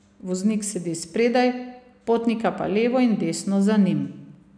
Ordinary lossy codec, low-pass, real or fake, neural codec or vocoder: none; 9.9 kHz; real; none